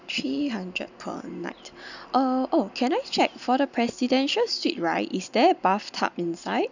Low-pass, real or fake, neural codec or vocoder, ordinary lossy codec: 7.2 kHz; real; none; none